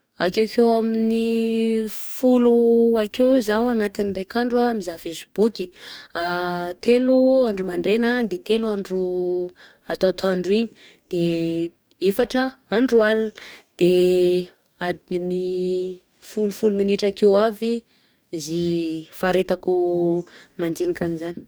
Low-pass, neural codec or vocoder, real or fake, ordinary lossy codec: none; codec, 44.1 kHz, 2.6 kbps, DAC; fake; none